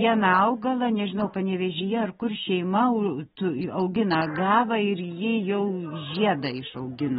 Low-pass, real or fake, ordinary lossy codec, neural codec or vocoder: 19.8 kHz; real; AAC, 16 kbps; none